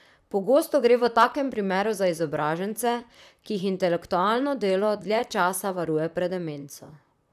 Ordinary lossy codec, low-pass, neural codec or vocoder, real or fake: none; 14.4 kHz; vocoder, 44.1 kHz, 128 mel bands, Pupu-Vocoder; fake